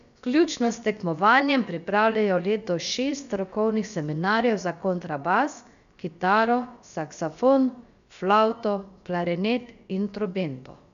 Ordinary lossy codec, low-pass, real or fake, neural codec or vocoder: none; 7.2 kHz; fake; codec, 16 kHz, about 1 kbps, DyCAST, with the encoder's durations